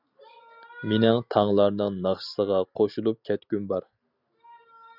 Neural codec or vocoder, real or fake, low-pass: none; real; 5.4 kHz